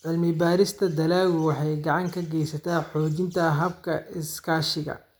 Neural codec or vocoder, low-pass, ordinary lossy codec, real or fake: none; none; none; real